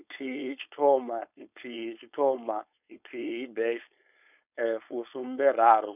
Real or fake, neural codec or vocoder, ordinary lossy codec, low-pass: fake; codec, 16 kHz, 4.8 kbps, FACodec; none; 3.6 kHz